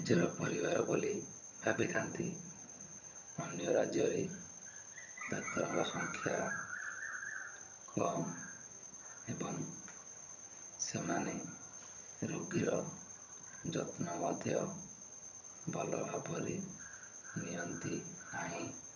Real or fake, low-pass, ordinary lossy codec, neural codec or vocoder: fake; 7.2 kHz; none; vocoder, 22.05 kHz, 80 mel bands, HiFi-GAN